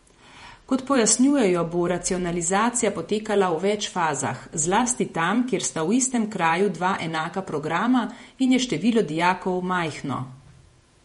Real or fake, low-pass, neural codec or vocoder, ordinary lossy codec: fake; 19.8 kHz; vocoder, 48 kHz, 128 mel bands, Vocos; MP3, 48 kbps